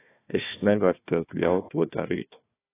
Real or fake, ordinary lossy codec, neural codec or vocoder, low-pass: fake; AAC, 16 kbps; codec, 16 kHz, 1 kbps, FunCodec, trained on Chinese and English, 50 frames a second; 3.6 kHz